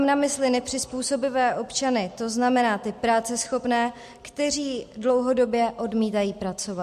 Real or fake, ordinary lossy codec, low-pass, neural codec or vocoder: real; MP3, 64 kbps; 14.4 kHz; none